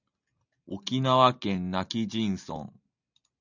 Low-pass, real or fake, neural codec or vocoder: 7.2 kHz; real; none